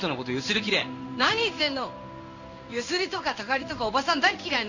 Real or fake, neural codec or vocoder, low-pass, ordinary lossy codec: fake; codec, 16 kHz in and 24 kHz out, 1 kbps, XY-Tokenizer; 7.2 kHz; none